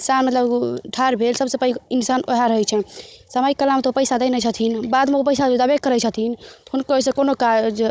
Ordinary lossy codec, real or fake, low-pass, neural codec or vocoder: none; fake; none; codec, 16 kHz, 16 kbps, FunCodec, trained on Chinese and English, 50 frames a second